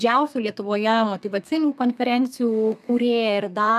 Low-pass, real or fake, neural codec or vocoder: 14.4 kHz; fake; codec, 32 kHz, 1.9 kbps, SNAC